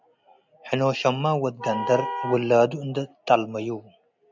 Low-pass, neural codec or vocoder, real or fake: 7.2 kHz; none; real